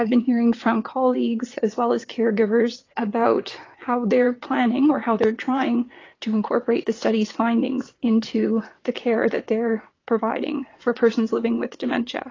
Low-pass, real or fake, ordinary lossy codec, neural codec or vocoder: 7.2 kHz; fake; AAC, 32 kbps; vocoder, 22.05 kHz, 80 mel bands, WaveNeXt